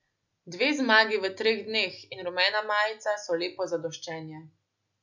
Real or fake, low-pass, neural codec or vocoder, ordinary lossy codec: real; 7.2 kHz; none; none